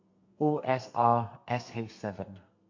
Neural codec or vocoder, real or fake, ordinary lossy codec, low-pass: codec, 32 kHz, 1.9 kbps, SNAC; fake; MP3, 48 kbps; 7.2 kHz